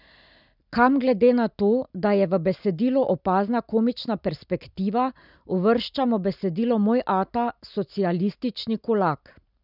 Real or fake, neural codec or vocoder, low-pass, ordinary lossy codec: real; none; 5.4 kHz; none